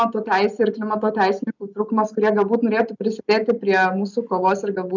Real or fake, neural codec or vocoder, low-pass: real; none; 7.2 kHz